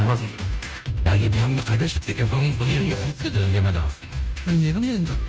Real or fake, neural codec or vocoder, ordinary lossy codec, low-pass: fake; codec, 16 kHz, 0.5 kbps, FunCodec, trained on Chinese and English, 25 frames a second; none; none